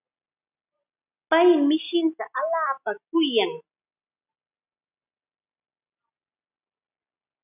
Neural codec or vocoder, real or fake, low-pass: none; real; 3.6 kHz